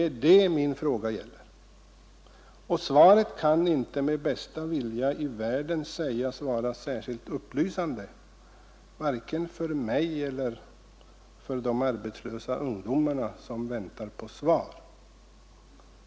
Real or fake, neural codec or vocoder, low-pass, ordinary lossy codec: real; none; none; none